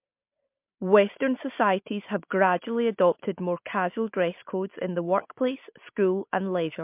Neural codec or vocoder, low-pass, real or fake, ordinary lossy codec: none; 3.6 kHz; real; MP3, 32 kbps